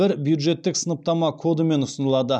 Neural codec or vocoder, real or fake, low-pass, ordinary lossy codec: none; real; none; none